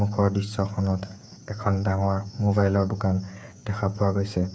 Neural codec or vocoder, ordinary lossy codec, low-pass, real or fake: codec, 16 kHz, 8 kbps, FreqCodec, smaller model; none; none; fake